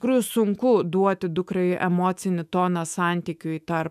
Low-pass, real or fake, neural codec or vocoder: 14.4 kHz; fake; autoencoder, 48 kHz, 128 numbers a frame, DAC-VAE, trained on Japanese speech